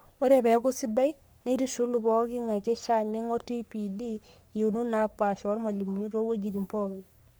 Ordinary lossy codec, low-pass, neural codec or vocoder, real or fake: none; none; codec, 44.1 kHz, 3.4 kbps, Pupu-Codec; fake